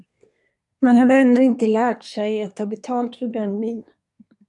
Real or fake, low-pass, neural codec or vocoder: fake; 10.8 kHz; codec, 24 kHz, 1 kbps, SNAC